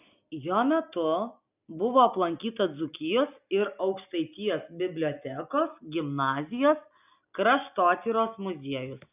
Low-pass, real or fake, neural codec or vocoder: 3.6 kHz; real; none